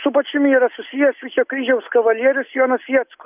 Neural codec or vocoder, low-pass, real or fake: none; 3.6 kHz; real